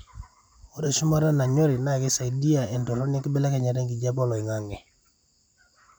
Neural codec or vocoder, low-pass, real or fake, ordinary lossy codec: vocoder, 44.1 kHz, 128 mel bands every 256 samples, BigVGAN v2; none; fake; none